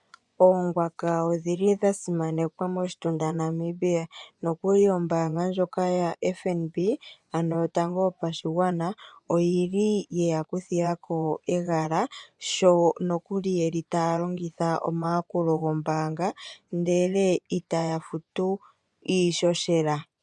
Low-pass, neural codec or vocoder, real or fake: 10.8 kHz; vocoder, 24 kHz, 100 mel bands, Vocos; fake